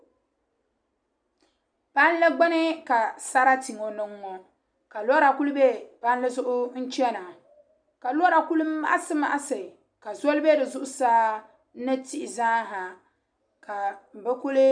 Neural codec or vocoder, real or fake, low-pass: none; real; 9.9 kHz